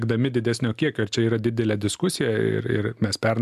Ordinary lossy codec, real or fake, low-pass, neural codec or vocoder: AAC, 96 kbps; real; 14.4 kHz; none